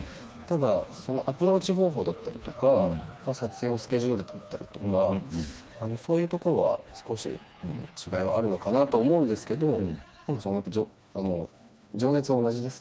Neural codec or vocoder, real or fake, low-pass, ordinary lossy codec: codec, 16 kHz, 2 kbps, FreqCodec, smaller model; fake; none; none